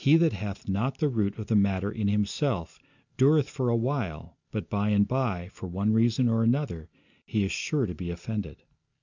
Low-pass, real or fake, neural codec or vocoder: 7.2 kHz; real; none